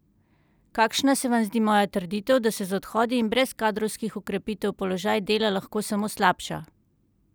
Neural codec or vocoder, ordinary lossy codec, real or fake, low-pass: none; none; real; none